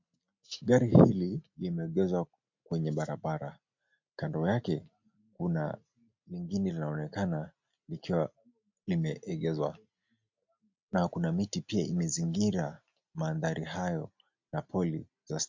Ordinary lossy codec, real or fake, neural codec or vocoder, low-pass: MP3, 48 kbps; real; none; 7.2 kHz